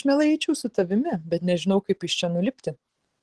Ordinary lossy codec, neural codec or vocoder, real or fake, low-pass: Opus, 16 kbps; none; real; 10.8 kHz